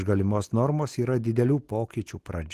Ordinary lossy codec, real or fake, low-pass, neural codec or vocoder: Opus, 16 kbps; real; 14.4 kHz; none